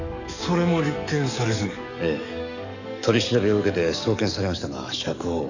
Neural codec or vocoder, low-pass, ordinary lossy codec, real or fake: codec, 44.1 kHz, 7.8 kbps, DAC; 7.2 kHz; none; fake